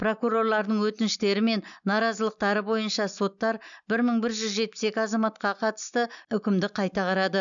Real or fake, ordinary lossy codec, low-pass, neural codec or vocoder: real; none; 7.2 kHz; none